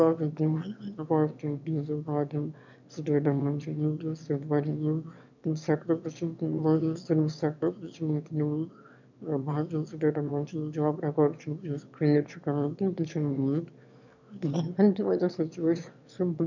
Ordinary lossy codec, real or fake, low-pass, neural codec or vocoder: none; fake; 7.2 kHz; autoencoder, 22.05 kHz, a latent of 192 numbers a frame, VITS, trained on one speaker